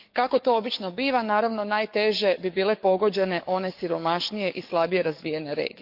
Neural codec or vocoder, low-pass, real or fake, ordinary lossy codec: codec, 44.1 kHz, 7.8 kbps, DAC; 5.4 kHz; fake; none